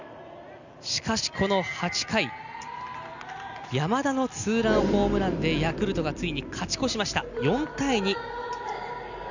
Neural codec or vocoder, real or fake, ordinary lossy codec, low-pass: none; real; none; 7.2 kHz